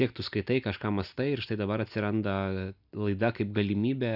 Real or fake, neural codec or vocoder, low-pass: real; none; 5.4 kHz